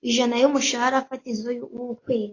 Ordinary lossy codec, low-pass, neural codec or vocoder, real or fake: AAC, 32 kbps; 7.2 kHz; none; real